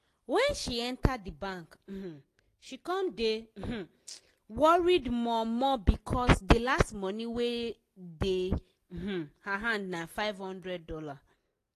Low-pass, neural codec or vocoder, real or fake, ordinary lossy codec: 14.4 kHz; none; real; AAC, 48 kbps